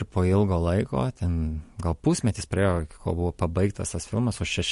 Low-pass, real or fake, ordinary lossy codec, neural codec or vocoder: 14.4 kHz; real; MP3, 48 kbps; none